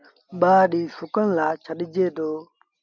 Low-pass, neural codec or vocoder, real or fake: 7.2 kHz; none; real